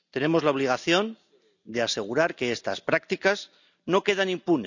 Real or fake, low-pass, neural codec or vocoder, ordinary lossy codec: real; 7.2 kHz; none; none